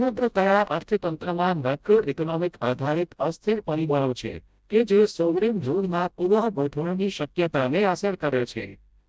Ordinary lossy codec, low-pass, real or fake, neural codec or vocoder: none; none; fake; codec, 16 kHz, 0.5 kbps, FreqCodec, smaller model